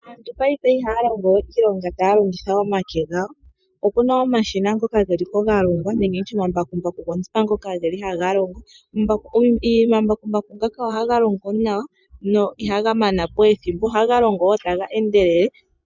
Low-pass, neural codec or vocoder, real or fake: 7.2 kHz; vocoder, 24 kHz, 100 mel bands, Vocos; fake